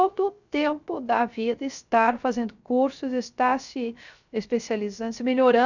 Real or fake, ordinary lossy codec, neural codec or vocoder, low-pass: fake; none; codec, 16 kHz, 0.3 kbps, FocalCodec; 7.2 kHz